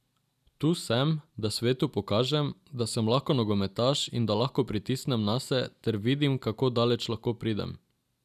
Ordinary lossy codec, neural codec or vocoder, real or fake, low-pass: none; none; real; 14.4 kHz